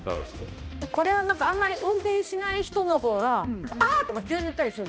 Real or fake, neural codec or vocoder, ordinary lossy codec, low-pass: fake; codec, 16 kHz, 1 kbps, X-Codec, HuBERT features, trained on balanced general audio; none; none